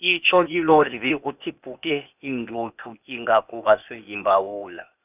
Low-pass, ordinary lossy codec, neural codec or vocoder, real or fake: 3.6 kHz; none; codec, 16 kHz, 0.8 kbps, ZipCodec; fake